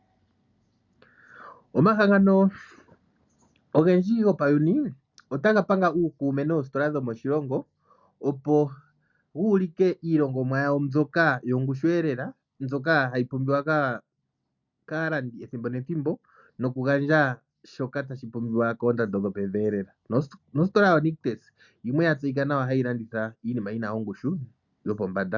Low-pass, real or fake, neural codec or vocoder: 7.2 kHz; real; none